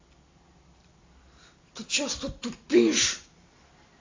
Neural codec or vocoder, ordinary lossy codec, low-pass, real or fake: none; AAC, 32 kbps; 7.2 kHz; real